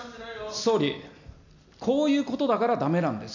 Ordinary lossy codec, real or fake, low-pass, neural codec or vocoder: none; real; 7.2 kHz; none